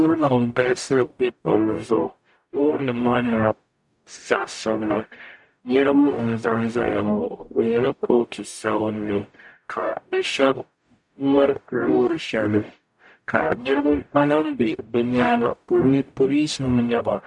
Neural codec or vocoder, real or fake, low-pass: codec, 44.1 kHz, 0.9 kbps, DAC; fake; 10.8 kHz